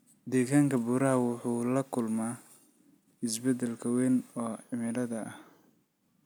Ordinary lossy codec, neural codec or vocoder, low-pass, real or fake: none; none; none; real